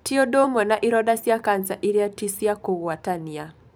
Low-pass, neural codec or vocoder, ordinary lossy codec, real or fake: none; vocoder, 44.1 kHz, 128 mel bands every 512 samples, BigVGAN v2; none; fake